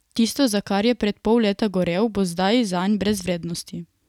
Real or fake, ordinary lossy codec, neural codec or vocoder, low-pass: real; none; none; 19.8 kHz